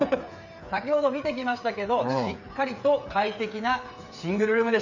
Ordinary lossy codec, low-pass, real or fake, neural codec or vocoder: none; 7.2 kHz; fake; codec, 16 kHz, 8 kbps, FreqCodec, smaller model